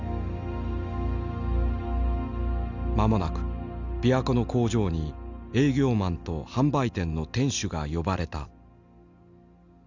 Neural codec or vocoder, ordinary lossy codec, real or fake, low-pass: none; none; real; 7.2 kHz